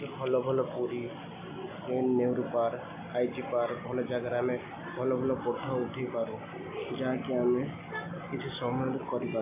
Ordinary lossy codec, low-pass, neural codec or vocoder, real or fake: AAC, 32 kbps; 3.6 kHz; none; real